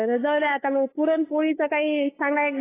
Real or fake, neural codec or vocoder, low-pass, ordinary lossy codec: fake; codec, 16 kHz, 8 kbps, FunCodec, trained on LibriTTS, 25 frames a second; 3.6 kHz; AAC, 16 kbps